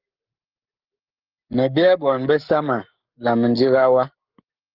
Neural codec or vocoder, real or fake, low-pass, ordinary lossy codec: codec, 44.1 kHz, 7.8 kbps, Pupu-Codec; fake; 5.4 kHz; Opus, 32 kbps